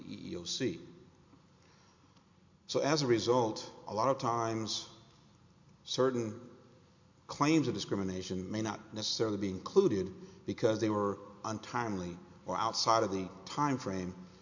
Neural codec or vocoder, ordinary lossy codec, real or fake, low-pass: none; MP3, 48 kbps; real; 7.2 kHz